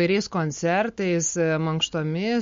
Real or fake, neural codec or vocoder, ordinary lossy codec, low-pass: real; none; MP3, 48 kbps; 7.2 kHz